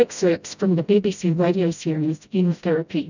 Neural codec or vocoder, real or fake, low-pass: codec, 16 kHz, 0.5 kbps, FreqCodec, smaller model; fake; 7.2 kHz